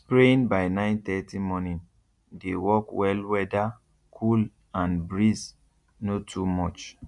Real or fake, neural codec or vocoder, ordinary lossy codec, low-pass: real; none; none; 10.8 kHz